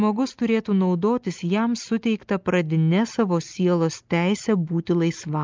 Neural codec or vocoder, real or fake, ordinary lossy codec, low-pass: none; real; Opus, 32 kbps; 7.2 kHz